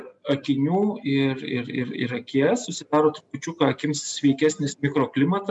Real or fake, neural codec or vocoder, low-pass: real; none; 10.8 kHz